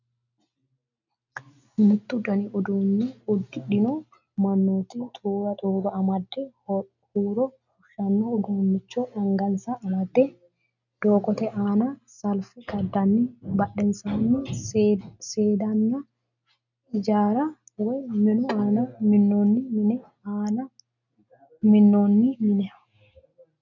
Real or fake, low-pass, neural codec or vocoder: real; 7.2 kHz; none